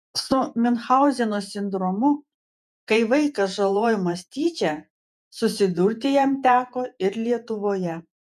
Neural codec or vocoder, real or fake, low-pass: vocoder, 48 kHz, 128 mel bands, Vocos; fake; 14.4 kHz